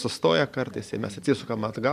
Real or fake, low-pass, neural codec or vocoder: fake; 14.4 kHz; vocoder, 44.1 kHz, 128 mel bands every 256 samples, BigVGAN v2